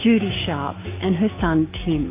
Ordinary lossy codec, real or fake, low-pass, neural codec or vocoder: AAC, 24 kbps; fake; 3.6 kHz; codec, 16 kHz, 6 kbps, DAC